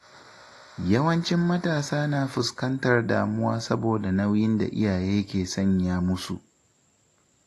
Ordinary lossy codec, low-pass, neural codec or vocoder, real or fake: AAC, 48 kbps; 14.4 kHz; none; real